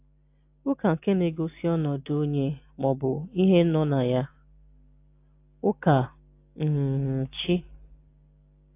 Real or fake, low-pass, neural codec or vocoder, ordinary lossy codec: real; 3.6 kHz; none; AAC, 24 kbps